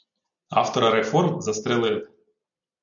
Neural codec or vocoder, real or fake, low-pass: none; real; 7.2 kHz